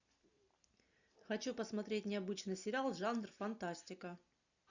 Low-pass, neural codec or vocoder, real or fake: 7.2 kHz; none; real